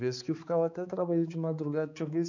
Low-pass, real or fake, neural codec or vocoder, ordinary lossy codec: 7.2 kHz; fake; codec, 16 kHz, 4 kbps, X-Codec, HuBERT features, trained on balanced general audio; none